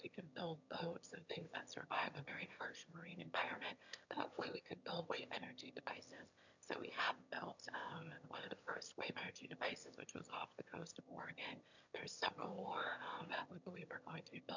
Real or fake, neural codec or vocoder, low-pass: fake; autoencoder, 22.05 kHz, a latent of 192 numbers a frame, VITS, trained on one speaker; 7.2 kHz